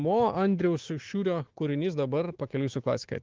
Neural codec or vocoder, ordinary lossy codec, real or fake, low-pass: autoencoder, 48 kHz, 128 numbers a frame, DAC-VAE, trained on Japanese speech; Opus, 16 kbps; fake; 7.2 kHz